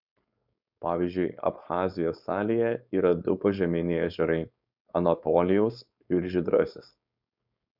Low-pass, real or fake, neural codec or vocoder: 5.4 kHz; fake; codec, 16 kHz, 4.8 kbps, FACodec